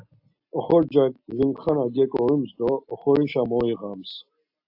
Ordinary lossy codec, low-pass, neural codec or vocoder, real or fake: AAC, 48 kbps; 5.4 kHz; none; real